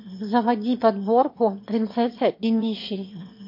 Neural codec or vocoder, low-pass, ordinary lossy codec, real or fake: autoencoder, 22.05 kHz, a latent of 192 numbers a frame, VITS, trained on one speaker; 5.4 kHz; MP3, 32 kbps; fake